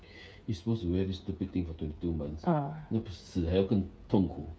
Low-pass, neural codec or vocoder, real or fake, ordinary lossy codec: none; codec, 16 kHz, 8 kbps, FreqCodec, smaller model; fake; none